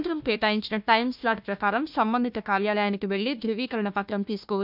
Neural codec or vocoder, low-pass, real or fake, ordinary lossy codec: codec, 16 kHz, 1 kbps, FunCodec, trained on Chinese and English, 50 frames a second; 5.4 kHz; fake; none